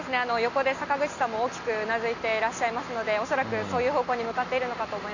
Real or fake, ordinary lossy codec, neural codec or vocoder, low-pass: real; none; none; 7.2 kHz